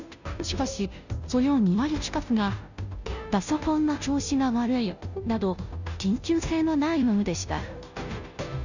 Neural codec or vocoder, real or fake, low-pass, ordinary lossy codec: codec, 16 kHz, 0.5 kbps, FunCodec, trained on Chinese and English, 25 frames a second; fake; 7.2 kHz; none